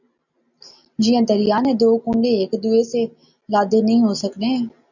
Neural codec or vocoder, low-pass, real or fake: none; 7.2 kHz; real